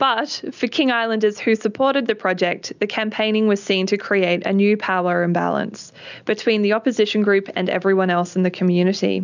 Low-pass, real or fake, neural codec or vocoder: 7.2 kHz; real; none